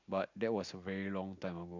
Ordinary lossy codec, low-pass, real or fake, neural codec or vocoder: none; 7.2 kHz; real; none